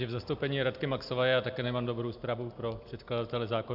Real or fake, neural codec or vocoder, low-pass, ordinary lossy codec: real; none; 5.4 kHz; AAC, 48 kbps